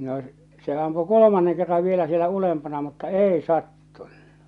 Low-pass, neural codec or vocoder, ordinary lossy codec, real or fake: 10.8 kHz; none; none; real